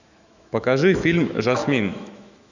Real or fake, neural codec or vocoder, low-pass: fake; autoencoder, 48 kHz, 128 numbers a frame, DAC-VAE, trained on Japanese speech; 7.2 kHz